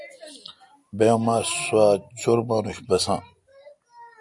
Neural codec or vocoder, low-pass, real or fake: none; 10.8 kHz; real